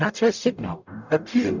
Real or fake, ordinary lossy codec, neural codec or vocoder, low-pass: fake; Opus, 64 kbps; codec, 44.1 kHz, 0.9 kbps, DAC; 7.2 kHz